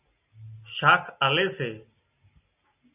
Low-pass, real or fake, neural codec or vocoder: 3.6 kHz; real; none